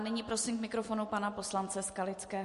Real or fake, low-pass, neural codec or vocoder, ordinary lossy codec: real; 14.4 kHz; none; MP3, 48 kbps